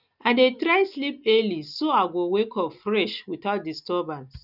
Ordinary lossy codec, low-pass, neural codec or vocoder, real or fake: none; 5.4 kHz; none; real